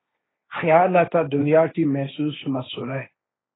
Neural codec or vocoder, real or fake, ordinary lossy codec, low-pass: codec, 16 kHz, 1.1 kbps, Voila-Tokenizer; fake; AAC, 16 kbps; 7.2 kHz